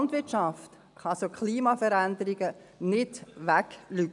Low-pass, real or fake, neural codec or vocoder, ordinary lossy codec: 10.8 kHz; real; none; none